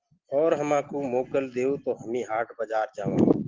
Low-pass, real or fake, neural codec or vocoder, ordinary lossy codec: 7.2 kHz; real; none; Opus, 16 kbps